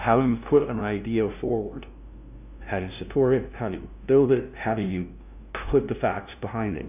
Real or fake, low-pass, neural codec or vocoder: fake; 3.6 kHz; codec, 16 kHz, 0.5 kbps, FunCodec, trained on LibriTTS, 25 frames a second